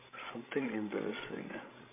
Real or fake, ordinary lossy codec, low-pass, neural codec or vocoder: fake; MP3, 24 kbps; 3.6 kHz; codec, 16 kHz, 16 kbps, FreqCodec, larger model